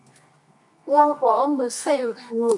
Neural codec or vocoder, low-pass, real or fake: codec, 24 kHz, 0.9 kbps, WavTokenizer, medium music audio release; 10.8 kHz; fake